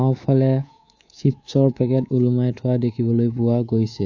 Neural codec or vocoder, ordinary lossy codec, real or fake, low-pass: codec, 24 kHz, 3.1 kbps, DualCodec; AAC, 48 kbps; fake; 7.2 kHz